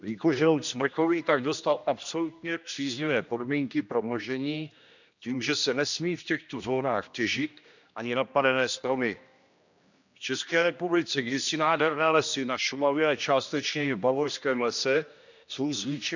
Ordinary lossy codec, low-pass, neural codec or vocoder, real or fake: none; 7.2 kHz; codec, 16 kHz, 1 kbps, X-Codec, HuBERT features, trained on general audio; fake